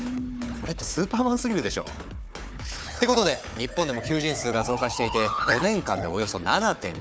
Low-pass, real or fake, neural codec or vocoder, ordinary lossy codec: none; fake; codec, 16 kHz, 4 kbps, FunCodec, trained on Chinese and English, 50 frames a second; none